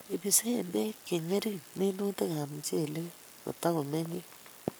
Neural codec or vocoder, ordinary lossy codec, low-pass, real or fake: codec, 44.1 kHz, 7.8 kbps, Pupu-Codec; none; none; fake